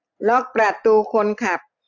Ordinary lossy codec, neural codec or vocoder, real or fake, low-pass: none; none; real; 7.2 kHz